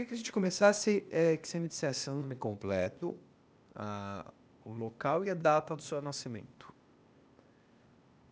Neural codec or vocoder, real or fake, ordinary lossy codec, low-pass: codec, 16 kHz, 0.8 kbps, ZipCodec; fake; none; none